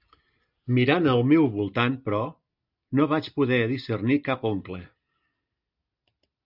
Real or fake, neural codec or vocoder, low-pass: real; none; 5.4 kHz